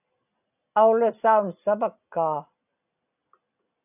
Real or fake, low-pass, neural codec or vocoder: real; 3.6 kHz; none